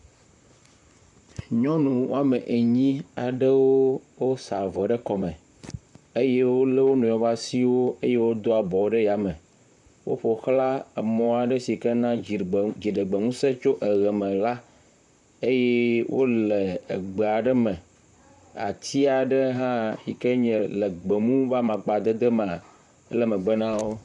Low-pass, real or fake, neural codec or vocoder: 10.8 kHz; fake; vocoder, 44.1 kHz, 128 mel bands, Pupu-Vocoder